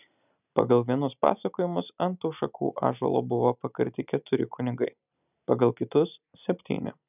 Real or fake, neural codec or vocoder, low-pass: real; none; 3.6 kHz